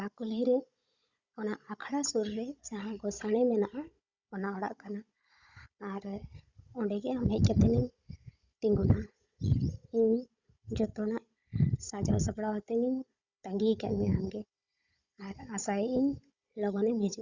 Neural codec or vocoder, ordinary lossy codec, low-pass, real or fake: codec, 16 kHz, 16 kbps, FunCodec, trained on Chinese and English, 50 frames a second; Opus, 64 kbps; 7.2 kHz; fake